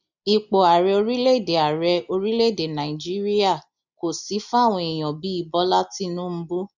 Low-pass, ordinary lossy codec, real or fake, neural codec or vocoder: 7.2 kHz; none; real; none